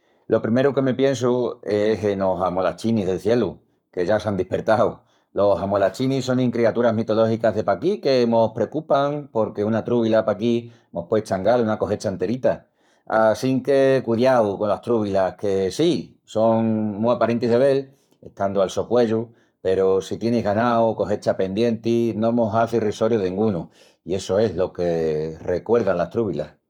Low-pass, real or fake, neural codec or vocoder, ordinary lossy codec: 19.8 kHz; fake; codec, 44.1 kHz, 7.8 kbps, Pupu-Codec; none